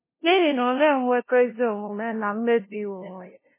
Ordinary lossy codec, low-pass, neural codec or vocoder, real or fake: MP3, 16 kbps; 3.6 kHz; codec, 16 kHz, 0.5 kbps, FunCodec, trained on LibriTTS, 25 frames a second; fake